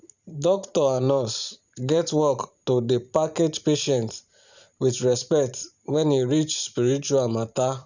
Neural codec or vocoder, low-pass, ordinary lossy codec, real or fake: vocoder, 44.1 kHz, 128 mel bands every 512 samples, BigVGAN v2; 7.2 kHz; none; fake